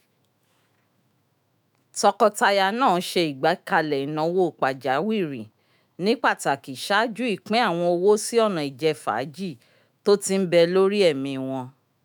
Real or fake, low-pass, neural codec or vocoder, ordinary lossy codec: fake; none; autoencoder, 48 kHz, 128 numbers a frame, DAC-VAE, trained on Japanese speech; none